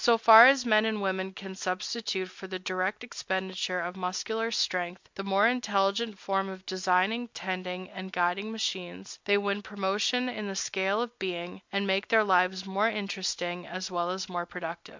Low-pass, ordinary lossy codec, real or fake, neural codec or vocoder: 7.2 kHz; MP3, 64 kbps; real; none